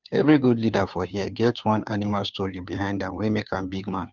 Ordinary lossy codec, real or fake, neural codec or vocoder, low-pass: none; fake; codec, 16 kHz, 2 kbps, FunCodec, trained on Chinese and English, 25 frames a second; 7.2 kHz